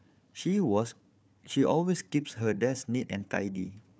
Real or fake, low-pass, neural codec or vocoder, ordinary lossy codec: fake; none; codec, 16 kHz, 4 kbps, FunCodec, trained on Chinese and English, 50 frames a second; none